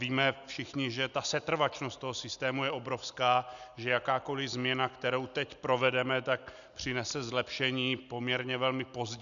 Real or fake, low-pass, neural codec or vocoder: real; 7.2 kHz; none